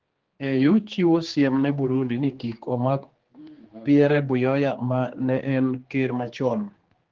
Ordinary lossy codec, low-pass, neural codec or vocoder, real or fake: Opus, 16 kbps; 7.2 kHz; codec, 16 kHz, 2 kbps, X-Codec, HuBERT features, trained on general audio; fake